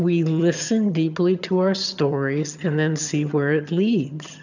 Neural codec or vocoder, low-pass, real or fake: vocoder, 22.05 kHz, 80 mel bands, HiFi-GAN; 7.2 kHz; fake